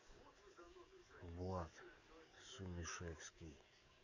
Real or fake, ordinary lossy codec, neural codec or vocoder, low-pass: fake; none; codec, 44.1 kHz, 7.8 kbps, DAC; 7.2 kHz